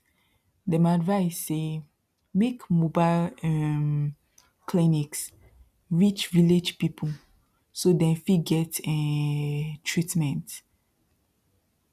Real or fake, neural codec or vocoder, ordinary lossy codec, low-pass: real; none; none; 14.4 kHz